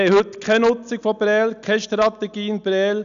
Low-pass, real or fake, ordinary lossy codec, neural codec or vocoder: 7.2 kHz; real; none; none